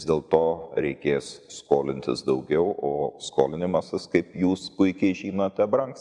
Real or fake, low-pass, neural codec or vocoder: fake; 10.8 kHz; vocoder, 44.1 kHz, 128 mel bands every 512 samples, BigVGAN v2